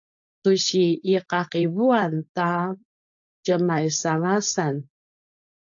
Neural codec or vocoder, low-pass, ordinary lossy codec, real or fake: codec, 16 kHz, 4.8 kbps, FACodec; 7.2 kHz; AAC, 64 kbps; fake